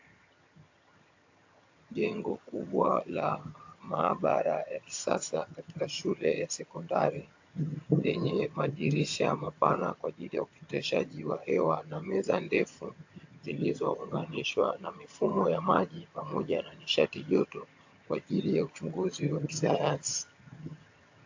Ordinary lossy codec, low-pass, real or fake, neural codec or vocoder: AAC, 48 kbps; 7.2 kHz; fake; vocoder, 22.05 kHz, 80 mel bands, HiFi-GAN